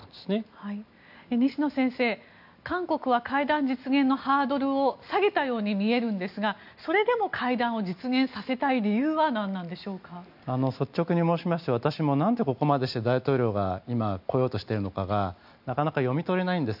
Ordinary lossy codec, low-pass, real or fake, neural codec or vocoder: none; 5.4 kHz; real; none